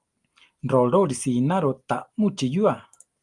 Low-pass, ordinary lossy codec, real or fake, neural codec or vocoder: 10.8 kHz; Opus, 32 kbps; real; none